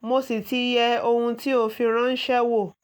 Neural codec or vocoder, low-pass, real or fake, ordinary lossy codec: none; none; real; none